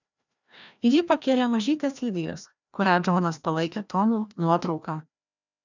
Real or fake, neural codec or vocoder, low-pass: fake; codec, 16 kHz, 1 kbps, FreqCodec, larger model; 7.2 kHz